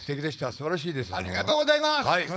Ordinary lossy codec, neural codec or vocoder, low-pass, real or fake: none; codec, 16 kHz, 4.8 kbps, FACodec; none; fake